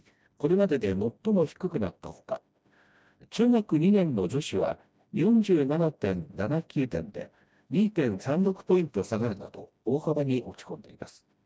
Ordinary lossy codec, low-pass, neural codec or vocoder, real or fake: none; none; codec, 16 kHz, 1 kbps, FreqCodec, smaller model; fake